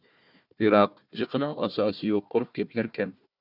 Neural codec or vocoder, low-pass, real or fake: codec, 16 kHz, 1 kbps, FunCodec, trained on Chinese and English, 50 frames a second; 5.4 kHz; fake